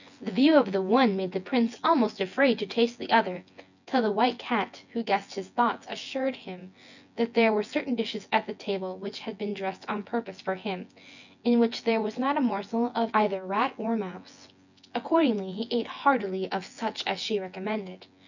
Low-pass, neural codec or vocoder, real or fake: 7.2 kHz; vocoder, 24 kHz, 100 mel bands, Vocos; fake